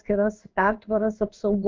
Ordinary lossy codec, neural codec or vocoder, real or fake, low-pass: Opus, 24 kbps; codec, 16 kHz in and 24 kHz out, 1 kbps, XY-Tokenizer; fake; 7.2 kHz